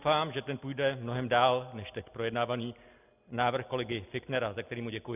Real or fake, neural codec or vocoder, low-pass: real; none; 3.6 kHz